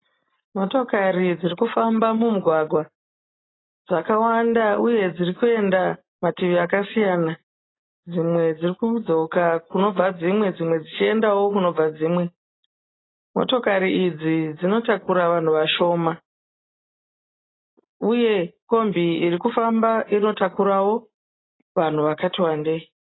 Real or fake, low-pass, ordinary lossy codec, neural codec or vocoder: real; 7.2 kHz; AAC, 16 kbps; none